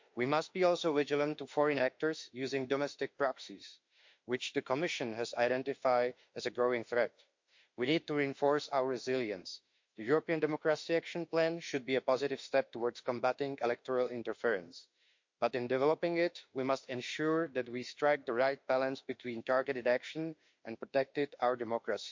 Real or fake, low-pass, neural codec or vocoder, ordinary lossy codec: fake; 7.2 kHz; autoencoder, 48 kHz, 32 numbers a frame, DAC-VAE, trained on Japanese speech; MP3, 48 kbps